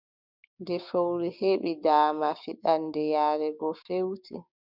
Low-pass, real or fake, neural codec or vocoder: 5.4 kHz; fake; codec, 16 kHz, 6 kbps, DAC